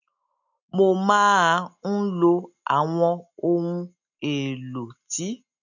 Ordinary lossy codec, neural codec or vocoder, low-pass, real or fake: none; none; 7.2 kHz; real